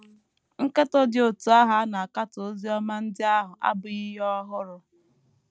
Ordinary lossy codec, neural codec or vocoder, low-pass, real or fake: none; none; none; real